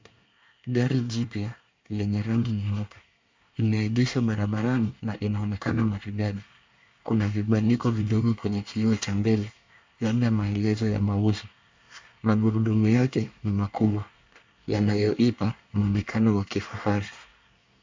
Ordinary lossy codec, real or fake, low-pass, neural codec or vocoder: MP3, 64 kbps; fake; 7.2 kHz; codec, 24 kHz, 1 kbps, SNAC